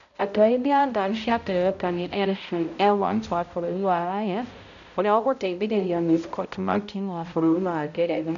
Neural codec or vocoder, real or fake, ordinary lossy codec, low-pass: codec, 16 kHz, 0.5 kbps, X-Codec, HuBERT features, trained on balanced general audio; fake; none; 7.2 kHz